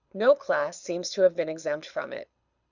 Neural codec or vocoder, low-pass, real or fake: codec, 24 kHz, 6 kbps, HILCodec; 7.2 kHz; fake